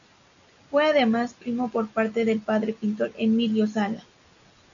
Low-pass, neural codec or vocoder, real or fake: 7.2 kHz; none; real